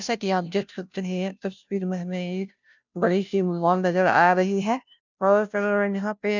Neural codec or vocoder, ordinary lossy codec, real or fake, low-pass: codec, 16 kHz, 0.5 kbps, FunCodec, trained on Chinese and English, 25 frames a second; none; fake; 7.2 kHz